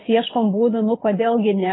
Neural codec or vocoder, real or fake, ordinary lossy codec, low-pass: codec, 24 kHz, 6 kbps, HILCodec; fake; AAC, 16 kbps; 7.2 kHz